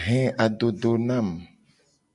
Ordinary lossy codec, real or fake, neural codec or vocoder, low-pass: MP3, 96 kbps; real; none; 10.8 kHz